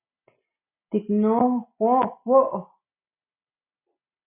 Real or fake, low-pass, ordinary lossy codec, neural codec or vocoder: real; 3.6 kHz; MP3, 24 kbps; none